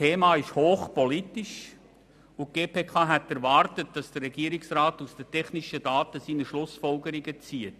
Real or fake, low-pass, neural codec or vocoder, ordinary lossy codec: real; 14.4 kHz; none; none